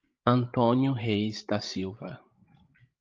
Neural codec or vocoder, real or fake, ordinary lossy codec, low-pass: codec, 16 kHz, 16 kbps, FreqCodec, larger model; fake; Opus, 24 kbps; 7.2 kHz